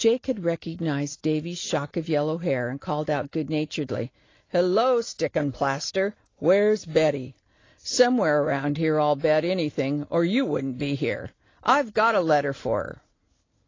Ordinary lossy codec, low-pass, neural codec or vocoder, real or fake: AAC, 32 kbps; 7.2 kHz; none; real